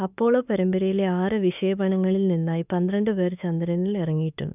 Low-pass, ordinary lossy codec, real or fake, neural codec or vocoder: 3.6 kHz; none; fake; autoencoder, 48 kHz, 32 numbers a frame, DAC-VAE, trained on Japanese speech